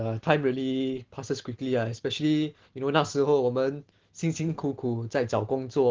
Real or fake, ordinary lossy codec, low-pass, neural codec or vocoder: real; Opus, 16 kbps; 7.2 kHz; none